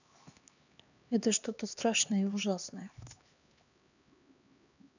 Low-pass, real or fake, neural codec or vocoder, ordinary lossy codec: 7.2 kHz; fake; codec, 16 kHz, 2 kbps, X-Codec, HuBERT features, trained on LibriSpeech; none